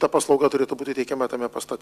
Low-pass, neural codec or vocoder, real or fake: 14.4 kHz; none; real